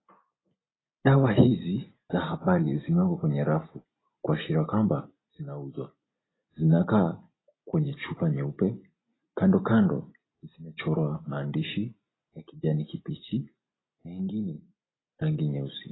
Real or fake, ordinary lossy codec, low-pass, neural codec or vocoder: real; AAC, 16 kbps; 7.2 kHz; none